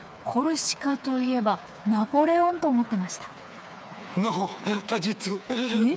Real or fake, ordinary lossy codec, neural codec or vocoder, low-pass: fake; none; codec, 16 kHz, 4 kbps, FreqCodec, smaller model; none